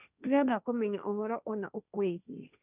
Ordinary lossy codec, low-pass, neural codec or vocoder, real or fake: none; 3.6 kHz; codec, 16 kHz, 1.1 kbps, Voila-Tokenizer; fake